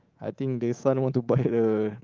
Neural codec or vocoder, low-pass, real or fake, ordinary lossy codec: codec, 24 kHz, 3.1 kbps, DualCodec; 7.2 kHz; fake; Opus, 32 kbps